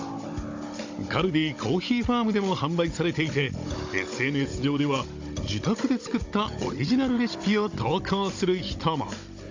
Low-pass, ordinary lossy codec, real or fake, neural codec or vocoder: 7.2 kHz; AAC, 48 kbps; fake; codec, 16 kHz, 16 kbps, FunCodec, trained on Chinese and English, 50 frames a second